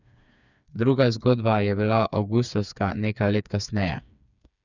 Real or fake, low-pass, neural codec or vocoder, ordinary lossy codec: fake; 7.2 kHz; codec, 16 kHz, 4 kbps, FreqCodec, smaller model; none